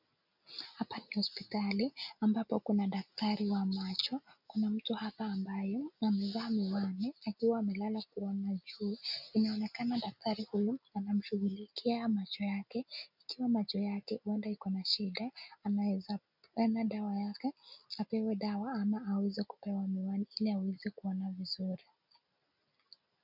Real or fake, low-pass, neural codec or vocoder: real; 5.4 kHz; none